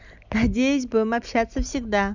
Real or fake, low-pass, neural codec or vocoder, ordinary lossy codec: real; 7.2 kHz; none; none